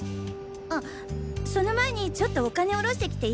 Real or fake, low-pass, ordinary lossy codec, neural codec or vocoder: real; none; none; none